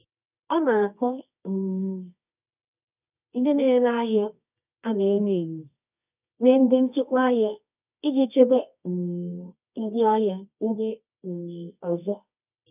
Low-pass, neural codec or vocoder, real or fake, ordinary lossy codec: 3.6 kHz; codec, 24 kHz, 0.9 kbps, WavTokenizer, medium music audio release; fake; none